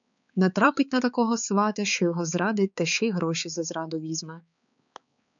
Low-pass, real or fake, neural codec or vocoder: 7.2 kHz; fake; codec, 16 kHz, 4 kbps, X-Codec, HuBERT features, trained on balanced general audio